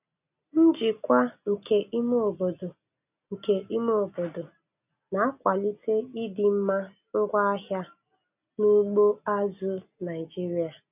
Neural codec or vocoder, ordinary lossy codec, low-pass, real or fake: none; none; 3.6 kHz; real